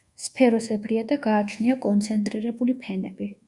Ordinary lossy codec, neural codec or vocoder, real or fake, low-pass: Opus, 64 kbps; codec, 24 kHz, 1.2 kbps, DualCodec; fake; 10.8 kHz